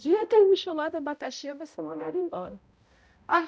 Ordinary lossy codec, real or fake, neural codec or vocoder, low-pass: none; fake; codec, 16 kHz, 0.5 kbps, X-Codec, HuBERT features, trained on balanced general audio; none